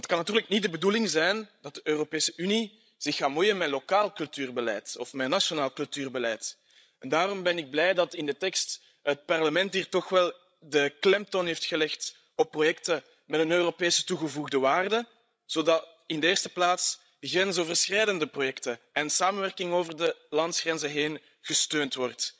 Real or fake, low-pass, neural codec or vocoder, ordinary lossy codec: fake; none; codec, 16 kHz, 16 kbps, FreqCodec, larger model; none